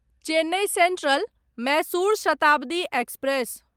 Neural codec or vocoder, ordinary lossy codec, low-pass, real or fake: none; Opus, 32 kbps; 19.8 kHz; real